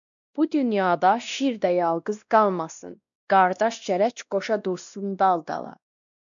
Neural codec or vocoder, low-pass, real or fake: codec, 16 kHz, 1 kbps, X-Codec, WavLM features, trained on Multilingual LibriSpeech; 7.2 kHz; fake